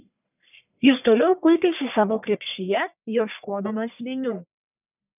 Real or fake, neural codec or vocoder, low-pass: fake; codec, 44.1 kHz, 1.7 kbps, Pupu-Codec; 3.6 kHz